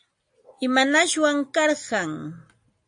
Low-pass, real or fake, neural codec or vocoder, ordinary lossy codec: 9.9 kHz; real; none; AAC, 64 kbps